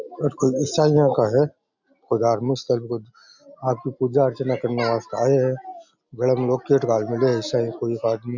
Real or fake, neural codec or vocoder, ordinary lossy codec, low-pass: real; none; none; 7.2 kHz